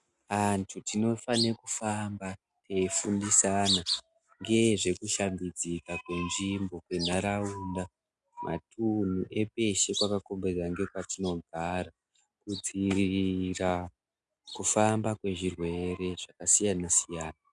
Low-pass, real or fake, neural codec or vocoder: 10.8 kHz; real; none